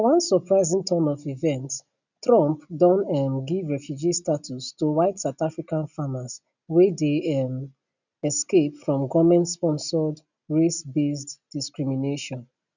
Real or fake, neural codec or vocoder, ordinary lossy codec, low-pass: real; none; none; 7.2 kHz